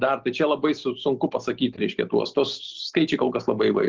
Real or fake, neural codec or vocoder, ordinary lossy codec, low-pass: real; none; Opus, 16 kbps; 7.2 kHz